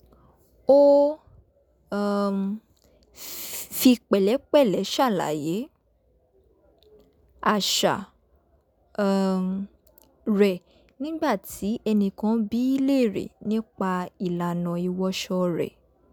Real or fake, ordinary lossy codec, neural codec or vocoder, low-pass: real; none; none; none